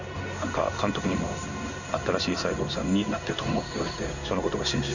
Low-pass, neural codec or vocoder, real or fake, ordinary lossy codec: 7.2 kHz; codec, 16 kHz in and 24 kHz out, 1 kbps, XY-Tokenizer; fake; none